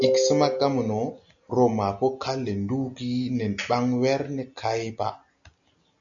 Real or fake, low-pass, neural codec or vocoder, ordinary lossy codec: real; 7.2 kHz; none; MP3, 96 kbps